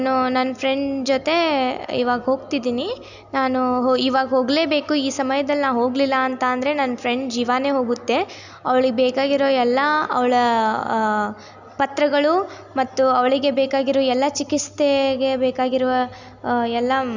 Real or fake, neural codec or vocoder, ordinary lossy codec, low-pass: real; none; none; 7.2 kHz